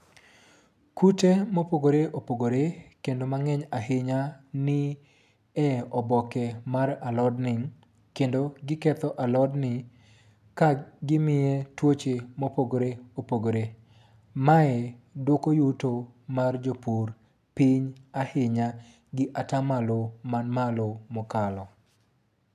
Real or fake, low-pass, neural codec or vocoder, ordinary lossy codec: real; 14.4 kHz; none; none